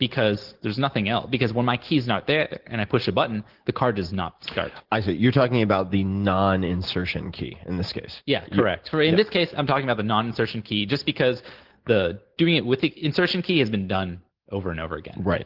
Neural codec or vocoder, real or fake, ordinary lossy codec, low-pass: none; real; Opus, 16 kbps; 5.4 kHz